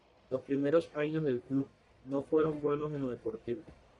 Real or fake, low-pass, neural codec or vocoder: fake; 10.8 kHz; codec, 44.1 kHz, 1.7 kbps, Pupu-Codec